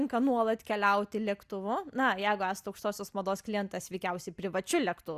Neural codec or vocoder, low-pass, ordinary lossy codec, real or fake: none; 14.4 kHz; Opus, 64 kbps; real